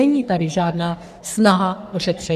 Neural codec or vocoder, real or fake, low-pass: codec, 44.1 kHz, 3.4 kbps, Pupu-Codec; fake; 14.4 kHz